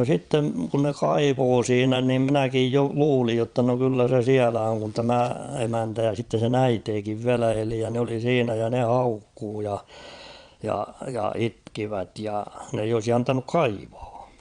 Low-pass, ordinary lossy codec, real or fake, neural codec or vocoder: 9.9 kHz; Opus, 64 kbps; fake; vocoder, 22.05 kHz, 80 mel bands, Vocos